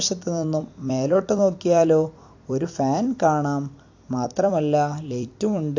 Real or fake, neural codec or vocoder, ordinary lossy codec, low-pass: fake; vocoder, 44.1 kHz, 128 mel bands every 256 samples, BigVGAN v2; AAC, 48 kbps; 7.2 kHz